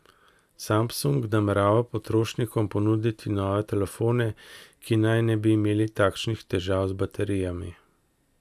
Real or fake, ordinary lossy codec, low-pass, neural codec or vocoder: real; none; 14.4 kHz; none